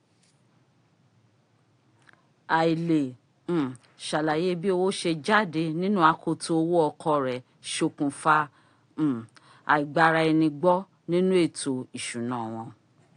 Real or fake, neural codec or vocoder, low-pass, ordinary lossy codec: real; none; 9.9 kHz; AAC, 48 kbps